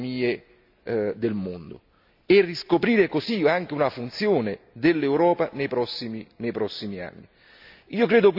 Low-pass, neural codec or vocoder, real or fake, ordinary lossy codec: 5.4 kHz; none; real; none